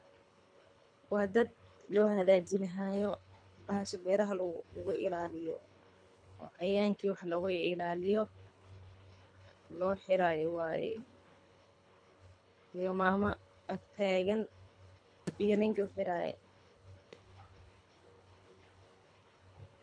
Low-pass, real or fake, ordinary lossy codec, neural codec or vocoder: 9.9 kHz; fake; none; codec, 24 kHz, 3 kbps, HILCodec